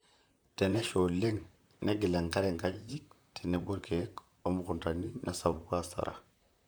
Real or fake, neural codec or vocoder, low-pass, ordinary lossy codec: fake; vocoder, 44.1 kHz, 128 mel bands, Pupu-Vocoder; none; none